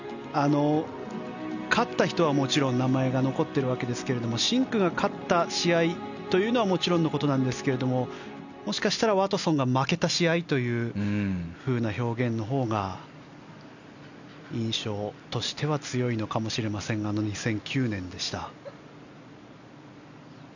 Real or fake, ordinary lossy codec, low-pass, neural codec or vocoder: real; none; 7.2 kHz; none